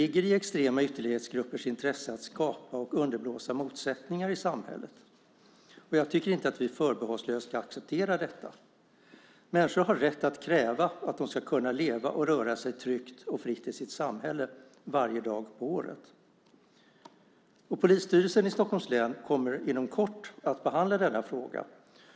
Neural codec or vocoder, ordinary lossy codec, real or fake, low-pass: none; none; real; none